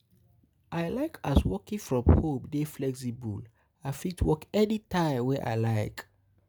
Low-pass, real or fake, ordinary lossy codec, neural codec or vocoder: none; real; none; none